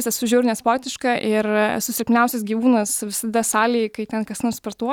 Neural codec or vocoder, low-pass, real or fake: none; 19.8 kHz; real